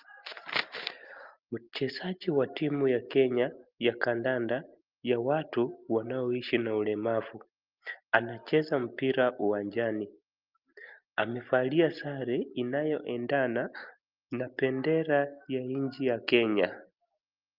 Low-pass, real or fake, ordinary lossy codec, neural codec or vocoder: 5.4 kHz; real; Opus, 32 kbps; none